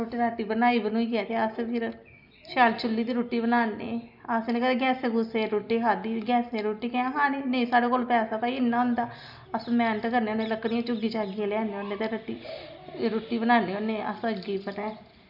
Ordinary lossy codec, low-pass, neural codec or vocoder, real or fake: none; 5.4 kHz; none; real